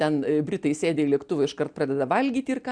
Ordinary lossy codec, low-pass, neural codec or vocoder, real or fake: MP3, 96 kbps; 9.9 kHz; none; real